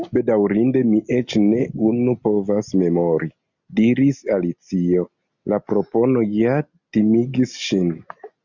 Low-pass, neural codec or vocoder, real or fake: 7.2 kHz; none; real